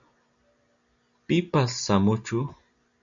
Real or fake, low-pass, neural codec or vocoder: real; 7.2 kHz; none